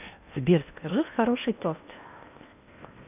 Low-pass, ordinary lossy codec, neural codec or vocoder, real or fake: 3.6 kHz; none; codec, 16 kHz in and 24 kHz out, 0.8 kbps, FocalCodec, streaming, 65536 codes; fake